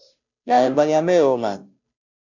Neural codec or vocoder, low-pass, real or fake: codec, 16 kHz, 0.5 kbps, FunCodec, trained on Chinese and English, 25 frames a second; 7.2 kHz; fake